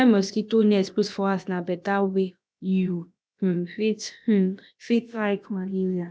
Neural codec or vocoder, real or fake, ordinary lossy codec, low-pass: codec, 16 kHz, about 1 kbps, DyCAST, with the encoder's durations; fake; none; none